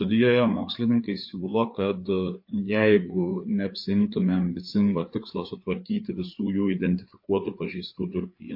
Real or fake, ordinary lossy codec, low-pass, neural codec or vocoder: fake; MP3, 32 kbps; 5.4 kHz; codec, 16 kHz, 4 kbps, FreqCodec, larger model